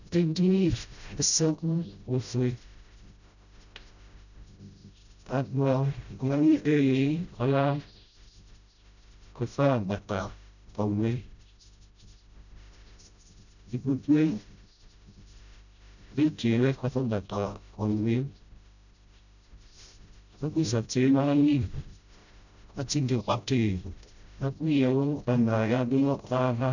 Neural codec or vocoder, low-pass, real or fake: codec, 16 kHz, 0.5 kbps, FreqCodec, smaller model; 7.2 kHz; fake